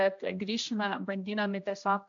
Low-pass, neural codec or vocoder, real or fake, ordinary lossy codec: 7.2 kHz; codec, 16 kHz, 1 kbps, X-Codec, HuBERT features, trained on general audio; fake; AAC, 64 kbps